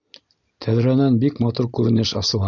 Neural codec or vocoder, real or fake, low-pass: none; real; 7.2 kHz